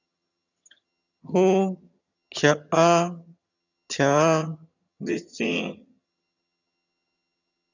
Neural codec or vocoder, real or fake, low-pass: vocoder, 22.05 kHz, 80 mel bands, HiFi-GAN; fake; 7.2 kHz